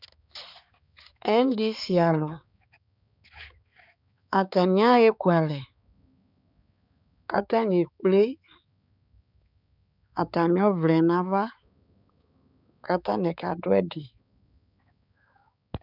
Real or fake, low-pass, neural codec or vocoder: fake; 5.4 kHz; codec, 16 kHz, 4 kbps, X-Codec, HuBERT features, trained on balanced general audio